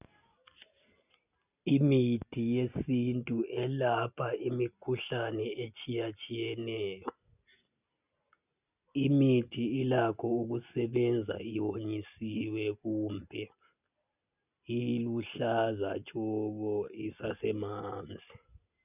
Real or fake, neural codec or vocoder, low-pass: real; none; 3.6 kHz